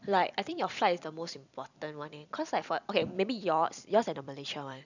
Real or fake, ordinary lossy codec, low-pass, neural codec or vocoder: fake; none; 7.2 kHz; vocoder, 44.1 kHz, 128 mel bands every 256 samples, BigVGAN v2